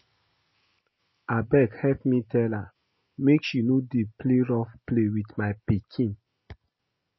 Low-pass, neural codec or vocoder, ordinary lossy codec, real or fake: 7.2 kHz; none; MP3, 24 kbps; real